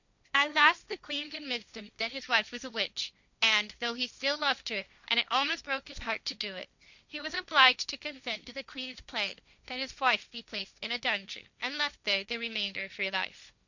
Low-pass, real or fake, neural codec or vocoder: 7.2 kHz; fake; codec, 16 kHz, 1.1 kbps, Voila-Tokenizer